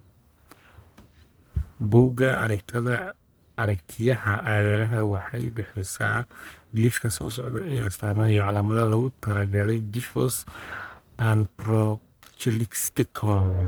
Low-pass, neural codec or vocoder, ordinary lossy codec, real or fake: none; codec, 44.1 kHz, 1.7 kbps, Pupu-Codec; none; fake